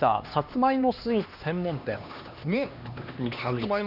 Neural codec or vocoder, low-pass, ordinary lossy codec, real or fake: codec, 16 kHz, 2 kbps, X-Codec, HuBERT features, trained on LibriSpeech; 5.4 kHz; none; fake